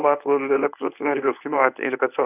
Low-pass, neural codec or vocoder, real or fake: 3.6 kHz; codec, 24 kHz, 0.9 kbps, WavTokenizer, medium speech release version 1; fake